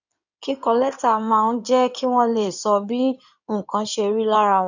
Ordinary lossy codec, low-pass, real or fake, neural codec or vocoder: none; 7.2 kHz; fake; codec, 16 kHz in and 24 kHz out, 2.2 kbps, FireRedTTS-2 codec